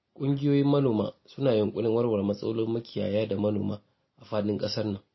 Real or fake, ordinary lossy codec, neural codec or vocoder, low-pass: real; MP3, 24 kbps; none; 7.2 kHz